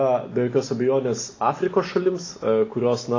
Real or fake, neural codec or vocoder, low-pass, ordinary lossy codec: real; none; 7.2 kHz; AAC, 32 kbps